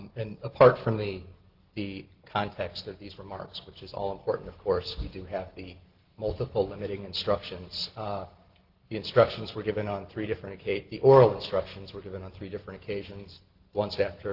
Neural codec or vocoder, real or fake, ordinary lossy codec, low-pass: none; real; Opus, 16 kbps; 5.4 kHz